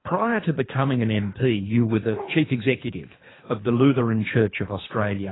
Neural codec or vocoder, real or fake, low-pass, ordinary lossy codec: codec, 24 kHz, 3 kbps, HILCodec; fake; 7.2 kHz; AAC, 16 kbps